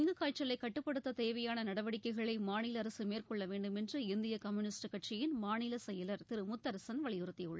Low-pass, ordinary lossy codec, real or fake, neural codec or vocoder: none; none; real; none